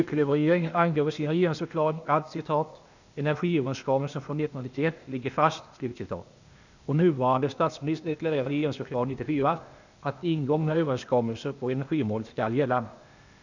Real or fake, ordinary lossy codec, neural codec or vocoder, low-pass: fake; none; codec, 16 kHz, 0.8 kbps, ZipCodec; 7.2 kHz